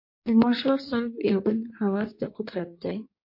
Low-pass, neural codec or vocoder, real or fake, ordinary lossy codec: 5.4 kHz; codec, 16 kHz in and 24 kHz out, 1.1 kbps, FireRedTTS-2 codec; fake; MP3, 32 kbps